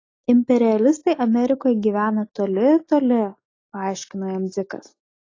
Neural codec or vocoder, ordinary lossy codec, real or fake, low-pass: none; AAC, 32 kbps; real; 7.2 kHz